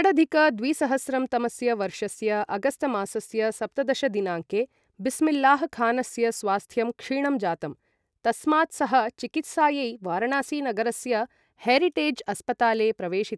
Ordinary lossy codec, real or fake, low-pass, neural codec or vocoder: none; real; none; none